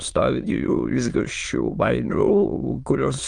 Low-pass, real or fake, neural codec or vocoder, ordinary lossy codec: 9.9 kHz; fake; autoencoder, 22.05 kHz, a latent of 192 numbers a frame, VITS, trained on many speakers; Opus, 32 kbps